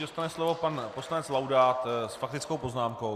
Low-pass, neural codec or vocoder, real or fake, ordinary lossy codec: 14.4 kHz; none; real; Opus, 64 kbps